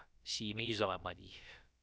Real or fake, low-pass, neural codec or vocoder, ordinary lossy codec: fake; none; codec, 16 kHz, about 1 kbps, DyCAST, with the encoder's durations; none